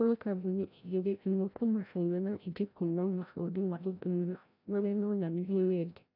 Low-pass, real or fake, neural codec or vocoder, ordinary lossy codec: 5.4 kHz; fake; codec, 16 kHz, 0.5 kbps, FreqCodec, larger model; none